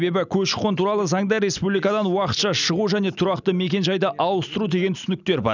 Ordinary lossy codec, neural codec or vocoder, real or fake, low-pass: none; none; real; 7.2 kHz